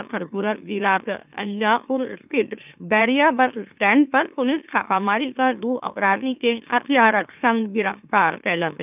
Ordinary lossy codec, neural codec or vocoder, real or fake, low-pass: none; autoencoder, 44.1 kHz, a latent of 192 numbers a frame, MeloTTS; fake; 3.6 kHz